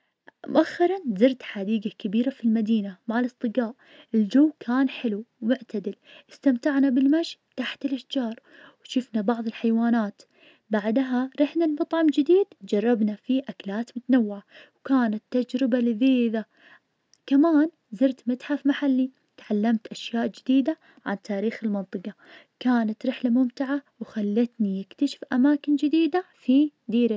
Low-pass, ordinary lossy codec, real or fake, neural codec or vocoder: none; none; real; none